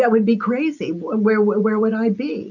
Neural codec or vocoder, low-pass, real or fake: none; 7.2 kHz; real